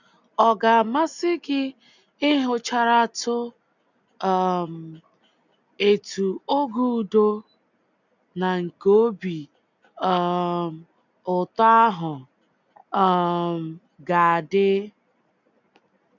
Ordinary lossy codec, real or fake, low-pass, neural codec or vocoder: none; real; 7.2 kHz; none